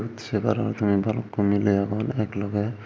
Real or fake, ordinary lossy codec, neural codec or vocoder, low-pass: real; none; none; none